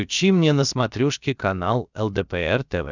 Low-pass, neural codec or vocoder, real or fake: 7.2 kHz; codec, 16 kHz, about 1 kbps, DyCAST, with the encoder's durations; fake